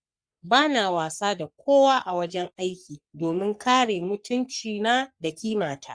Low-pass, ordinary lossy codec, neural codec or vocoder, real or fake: 9.9 kHz; Opus, 64 kbps; codec, 44.1 kHz, 3.4 kbps, Pupu-Codec; fake